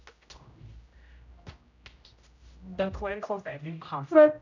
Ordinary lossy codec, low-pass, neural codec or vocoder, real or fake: none; 7.2 kHz; codec, 16 kHz, 0.5 kbps, X-Codec, HuBERT features, trained on general audio; fake